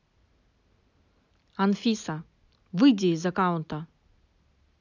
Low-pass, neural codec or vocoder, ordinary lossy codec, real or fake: 7.2 kHz; none; none; real